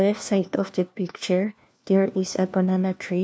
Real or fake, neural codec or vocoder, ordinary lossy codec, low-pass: fake; codec, 16 kHz, 1 kbps, FunCodec, trained on LibriTTS, 50 frames a second; none; none